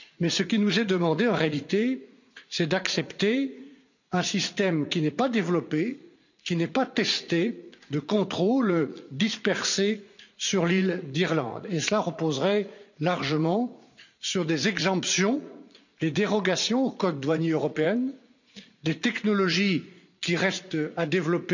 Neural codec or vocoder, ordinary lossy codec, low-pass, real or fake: vocoder, 44.1 kHz, 80 mel bands, Vocos; none; 7.2 kHz; fake